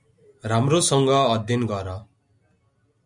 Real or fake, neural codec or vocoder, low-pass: real; none; 10.8 kHz